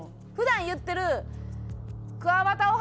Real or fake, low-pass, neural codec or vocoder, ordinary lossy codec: real; none; none; none